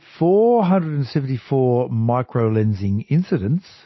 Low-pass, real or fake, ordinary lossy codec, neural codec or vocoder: 7.2 kHz; real; MP3, 24 kbps; none